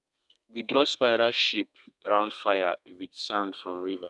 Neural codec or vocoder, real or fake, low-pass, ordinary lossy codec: codec, 32 kHz, 1.9 kbps, SNAC; fake; 10.8 kHz; none